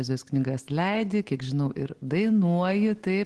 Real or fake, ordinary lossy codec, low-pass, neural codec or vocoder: real; Opus, 16 kbps; 10.8 kHz; none